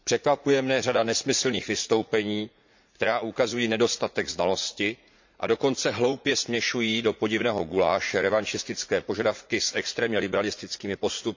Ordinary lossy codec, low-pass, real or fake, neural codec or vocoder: MP3, 64 kbps; 7.2 kHz; fake; vocoder, 44.1 kHz, 80 mel bands, Vocos